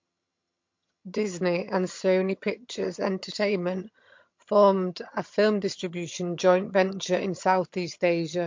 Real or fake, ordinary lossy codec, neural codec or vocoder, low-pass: fake; MP3, 48 kbps; vocoder, 22.05 kHz, 80 mel bands, HiFi-GAN; 7.2 kHz